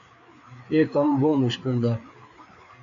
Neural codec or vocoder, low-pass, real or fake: codec, 16 kHz, 4 kbps, FreqCodec, larger model; 7.2 kHz; fake